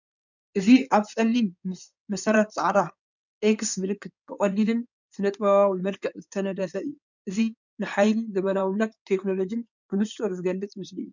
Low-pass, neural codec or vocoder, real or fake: 7.2 kHz; codec, 16 kHz in and 24 kHz out, 2.2 kbps, FireRedTTS-2 codec; fake